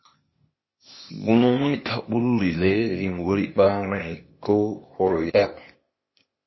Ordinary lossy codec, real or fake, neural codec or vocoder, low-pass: MP3, 24 kbps; fake; codec, 16 kHz, 0.8 kbps, ZipCodec; 7.2 kHz